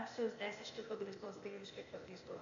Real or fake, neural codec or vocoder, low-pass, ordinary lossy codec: fake; codec, 16 kHz, 0.8 kbps, ZipCodec; 7.2 kHz; AAC, 64 kbps